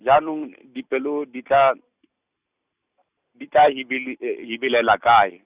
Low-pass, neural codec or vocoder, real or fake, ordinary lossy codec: 3.6 kHz; none; real; Opus, 64 kbps